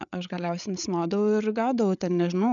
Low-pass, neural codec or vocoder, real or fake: 7.2 kHz; codec, 16 kHz, 8 kbps, FreqCodec, larger model; fake